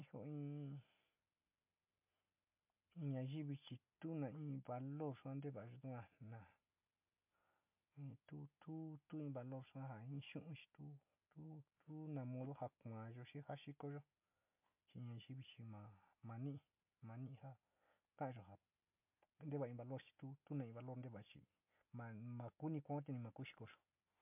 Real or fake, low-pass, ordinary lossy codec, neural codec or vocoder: real; 3.6 kHz; MP3, 32 kbps; none